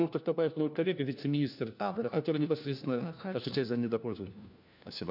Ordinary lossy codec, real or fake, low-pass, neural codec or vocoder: none; fake; 5.4 kHz; codec, 16 kHz, 1 kbps, FunCodec, trained on LibriTTS, 50 frames a second